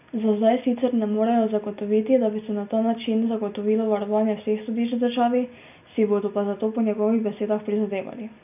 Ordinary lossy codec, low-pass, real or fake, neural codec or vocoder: none; 3.6 kHz; real; none